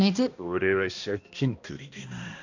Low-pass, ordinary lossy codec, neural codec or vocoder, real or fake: 7.2 kHz; none; codec, 16 kHz, 1 kbps, X-Codec, HuBERT features, trained on balanced general audio; fake